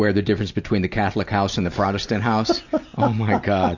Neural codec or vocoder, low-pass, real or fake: none; 7.2 kHz; real